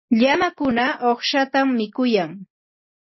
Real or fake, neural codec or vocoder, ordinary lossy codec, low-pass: real; none; MP3, 24 kbps; 7.2 kHz